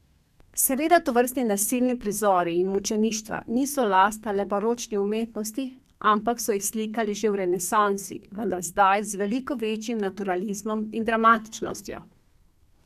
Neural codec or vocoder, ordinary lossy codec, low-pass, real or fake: codec, 32 kHz, 1.9 kbps, SNAC; none; 14.4 kHz; fake